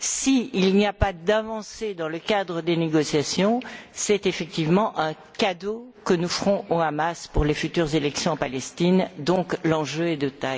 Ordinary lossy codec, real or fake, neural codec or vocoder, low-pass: none; real; none; none